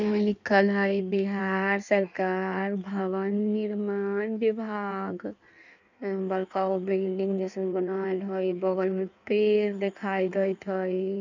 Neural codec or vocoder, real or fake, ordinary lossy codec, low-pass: codec, 16 kHz in and 24 kHz out, 1.1 kbps, FireRedTTS-2 codec; fake; none; 7.2 kHz